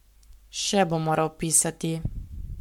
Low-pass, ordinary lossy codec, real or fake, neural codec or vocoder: 19.8 kHz; MP3, 96 kbps; fake; codec, 44.1 kHz, 7.8 kbps, Pupu-Codec